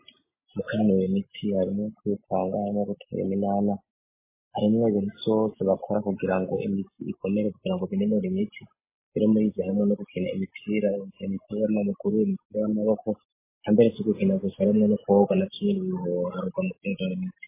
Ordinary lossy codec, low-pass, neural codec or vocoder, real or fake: MP3, 16 kbps; 3.6 kHz; none; real